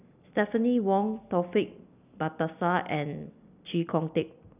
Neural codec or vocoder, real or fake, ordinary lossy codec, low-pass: none; real; none; 3.6 kHz